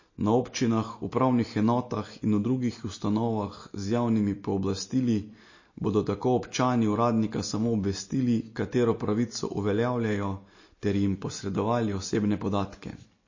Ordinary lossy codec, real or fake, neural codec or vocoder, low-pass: MP3, 32 kbps; real; none; 7.2 kHz